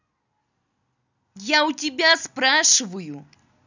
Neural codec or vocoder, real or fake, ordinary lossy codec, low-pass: none; real; none; 7.2 kHz